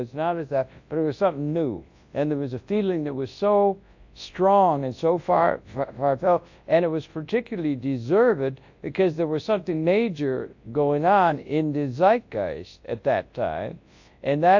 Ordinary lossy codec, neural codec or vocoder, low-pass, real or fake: AAC, 48 kbps; codec, 24 kHz, 0.9 kbps, WavTokenizer, large speech release; 7.2 kHz; fake